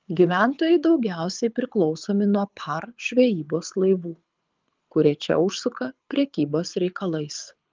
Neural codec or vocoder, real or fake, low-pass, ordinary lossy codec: codec, 24 kHz, 6 kbps, HILCodec; fake; 7.2 kHz; Opus, 24 kbps